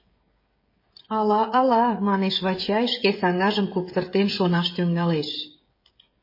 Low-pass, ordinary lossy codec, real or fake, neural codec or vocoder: 5.4 kHz; MP3, 24 kbps; fake; codec, 16 kHz, 16 kbps, FreqCodec, smaller model